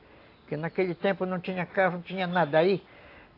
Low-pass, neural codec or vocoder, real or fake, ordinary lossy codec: 5.4 kHz; none; real; AAC, 32 kbps